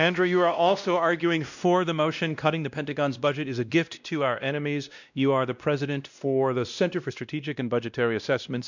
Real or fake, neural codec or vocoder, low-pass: fake; codec, 16 kHz, 1 kbps, X-Codec, WavLM features, trained on Multilingual LibriSpeech; 7.2 kHz